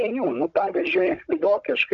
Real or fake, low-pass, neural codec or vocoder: fake; 7.2 kHz; codec, 16 kHz, 16 kbps, FunCodec, trained on Chinese and English, 50 frames a second